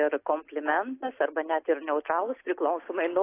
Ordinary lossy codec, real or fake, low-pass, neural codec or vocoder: AAC, 24 kbps; real; 3.6 kHz; none